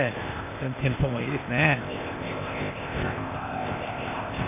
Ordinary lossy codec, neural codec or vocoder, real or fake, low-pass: MP3, 32 kbps; codec, 16 kHz, 0.8 kbps, ZipCodec; fake; 3.6 kHz